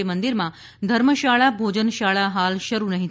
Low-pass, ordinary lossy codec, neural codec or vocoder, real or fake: none; none; none; real